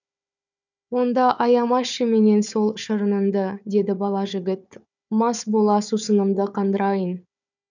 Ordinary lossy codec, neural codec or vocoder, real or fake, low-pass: none; codec, 16 kHz, 4 kbps, FunCodec, trained on Chinese and English, 50 frames a second; fake; 7.2 kHz